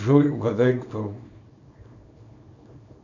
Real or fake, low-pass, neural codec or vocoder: fake; 7.2 kHz; codec, 24 kHz, 0.9 kbps, WavTokenizer, small release